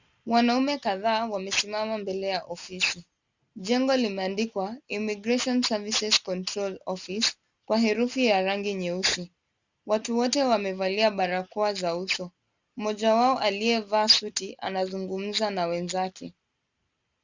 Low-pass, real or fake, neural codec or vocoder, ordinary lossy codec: 7.2 kHz; real; none; Opus, 64 kbps